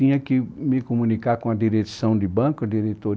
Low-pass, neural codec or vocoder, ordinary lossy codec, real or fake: none; none; none; real